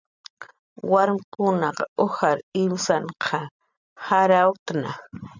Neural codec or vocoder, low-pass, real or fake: none; 7.2 kHz; real